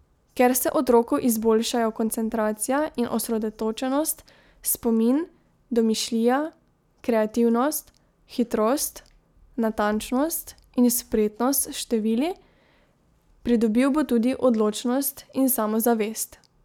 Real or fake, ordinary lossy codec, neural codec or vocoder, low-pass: real; none; none; 19.8 kHz